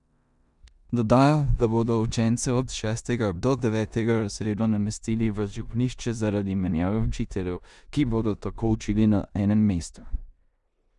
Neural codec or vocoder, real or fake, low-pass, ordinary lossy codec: codec, 16 kHz in and 24 kHz out, 0.9 kbps, LongCat-Audio-Codec, four codebook decoder; fake; 10.8 kHz; none